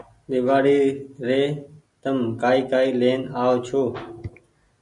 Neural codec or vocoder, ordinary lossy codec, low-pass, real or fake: none; MP3, 96 kbps; 10.8 kHz; real